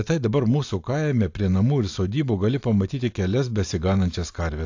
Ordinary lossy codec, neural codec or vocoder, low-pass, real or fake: AAC, 48 kbps; none; 7.2 kHz; real